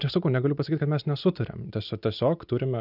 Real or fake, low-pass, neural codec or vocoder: real; 5.4 kHz; none